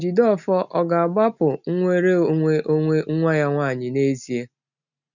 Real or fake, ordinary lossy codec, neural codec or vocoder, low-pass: real; none; none; 7.2 kHz